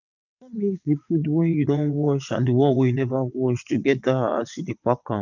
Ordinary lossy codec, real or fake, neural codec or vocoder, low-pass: none; fake; vocoder, 22.05 kHz, 80 mel bands, WaveNeXt; 7.2 kHz